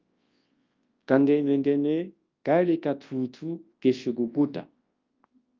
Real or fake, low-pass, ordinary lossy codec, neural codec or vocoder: fake; 7.2 kHz; Opus, 24 kbps; codec, 24 kHz, 0.9 kbps, WavTokenizer, large speech release